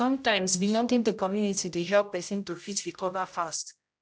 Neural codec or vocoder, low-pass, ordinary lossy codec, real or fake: codec, 16 kHz, 0.5 kbps, X-Codec, HuBERT features, trained on general audio; none; none; fake